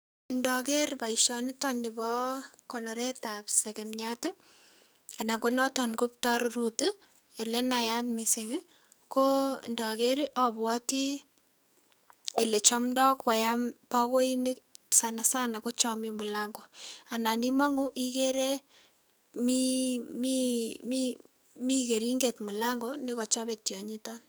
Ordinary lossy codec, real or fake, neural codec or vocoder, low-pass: none; fake; codec, 44.1 kHz, 2.6 kbps, SNAC; none